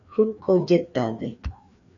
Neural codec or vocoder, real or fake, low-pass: codec, 16 kHz, 2 kbps, FreqCodec, larger model; fake; 7.2 kHz